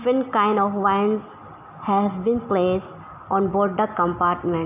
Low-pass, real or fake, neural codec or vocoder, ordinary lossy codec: 3.6 kHz; real; none; none